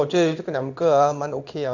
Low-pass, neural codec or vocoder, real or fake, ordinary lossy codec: 7.2 kHz; codec, 16 kHz in and 24 kHz out, 1 kbps, XY-Tokenizer; fake; none